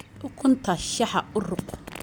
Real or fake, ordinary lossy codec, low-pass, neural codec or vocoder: real; none; none; none